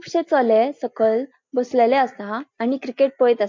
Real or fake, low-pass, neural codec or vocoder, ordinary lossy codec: real; 7.2 kHz; none; MP3, 48 kbps